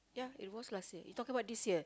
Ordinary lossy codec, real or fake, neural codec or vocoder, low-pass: none; real; none; none